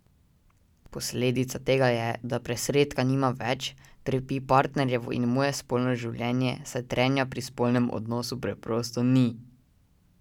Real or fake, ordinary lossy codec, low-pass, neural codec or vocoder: real; none; 19.8 kHz; none